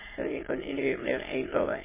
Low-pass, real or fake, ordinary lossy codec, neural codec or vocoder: 3.6 kHz; fake; MP3, 16 kbps; autoencoder, 22.05 kHz, a latent of 192 numbers a frame, VITS, trained on many speakers